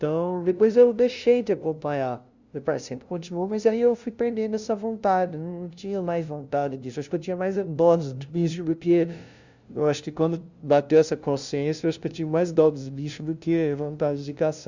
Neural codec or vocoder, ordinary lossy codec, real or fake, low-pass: codec, 16 kHz, 0.5 kbps, FunCodec, trained on LibriTTS, 25 frames a second; Opus, 64 kbps; fake; 7.2 kHz